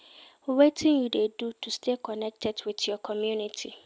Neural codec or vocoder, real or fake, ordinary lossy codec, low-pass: none; real; none; none